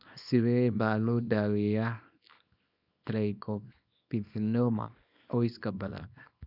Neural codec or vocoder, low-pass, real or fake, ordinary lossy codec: codec, 24 kHz, 0.9 kbps, WavTokenizer, small release; 5.4 kHz; fake; none